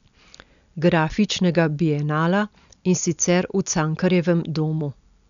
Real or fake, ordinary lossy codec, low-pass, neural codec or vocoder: real; none; 7.2 kHz; none